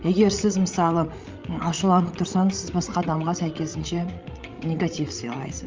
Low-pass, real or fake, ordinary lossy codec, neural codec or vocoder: 7.2 kHz; fake; Opus, 32 kbps; codec, 16 kHz, 16 kbps, FreqCodec, larger model